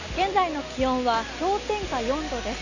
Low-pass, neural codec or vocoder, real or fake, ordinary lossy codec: 7.2 kHz; none; real; none